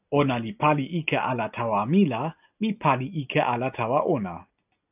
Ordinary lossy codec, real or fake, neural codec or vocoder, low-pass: AAC, 32 kbps; real; none; 3.6 kHz